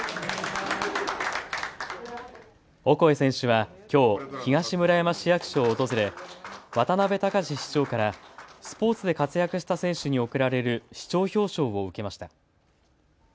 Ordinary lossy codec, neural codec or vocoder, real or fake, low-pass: none; none; real; none